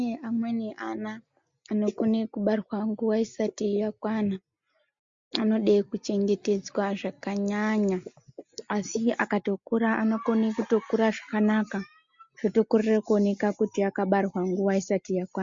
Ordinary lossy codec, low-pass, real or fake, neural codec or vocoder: MP3, 48 kbps; 7.2 kHz; real; none